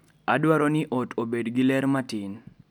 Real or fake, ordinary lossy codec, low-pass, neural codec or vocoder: real; none; 19.8 kHz; none